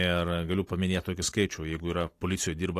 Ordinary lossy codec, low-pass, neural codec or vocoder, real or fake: AAC, 48 kbps; 14.4 kHz; vocoder, 44.1 kHz, 128 mel bands every 256 samples, BigVGAN v2; fake